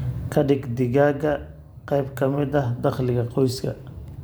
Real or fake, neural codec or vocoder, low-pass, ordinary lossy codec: fake; vocoder, 44.1 kHz, 128 mel bands every 512 samples, BigVGAN v2; none; none